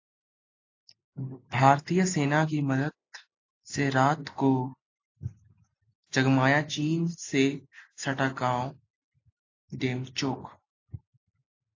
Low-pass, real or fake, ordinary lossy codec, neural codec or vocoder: 7.2 kHz; real; AAC, 48 kbps; none